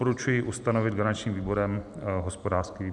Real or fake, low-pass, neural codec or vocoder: real; 10.8 kHz; none